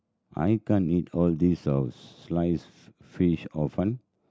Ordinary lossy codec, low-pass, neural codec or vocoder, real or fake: none; none; none; real